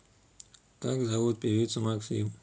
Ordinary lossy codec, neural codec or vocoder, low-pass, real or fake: none; none; none; real